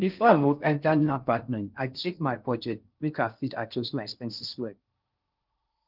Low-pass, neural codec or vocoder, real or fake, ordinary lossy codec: 5.4 kHz; codec, 16 kHz in and 24 kHz out, 0.6 kbps, FocalCodec, streaming, 4096 codes; fake; Opus, 24 kbps